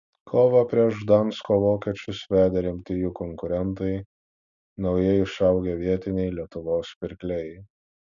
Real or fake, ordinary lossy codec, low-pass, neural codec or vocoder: real; MP3, 96 kbps; 7.2 kHz; none